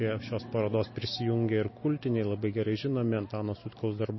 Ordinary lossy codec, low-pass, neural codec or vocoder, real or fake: MP3, 24 kbps; 7.2 kHz; none; real